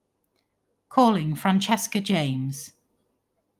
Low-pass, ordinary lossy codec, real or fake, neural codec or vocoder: 14.4 kHz; Opus, 32 kbps; real; none